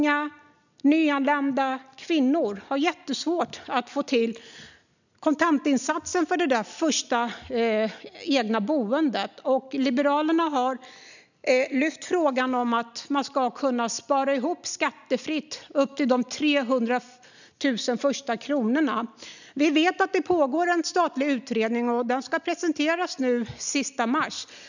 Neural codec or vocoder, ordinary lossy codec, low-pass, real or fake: none; none; 7.2 kHz; real